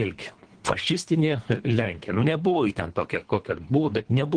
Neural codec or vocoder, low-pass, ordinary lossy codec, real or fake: codec, 24 kHz, 3 kbps, HILCodec; 9.9 kHz; Opus, 16 kbps; fake